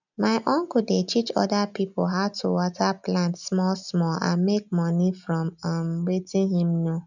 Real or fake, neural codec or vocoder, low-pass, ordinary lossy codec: real; none; 7.2 kHz; none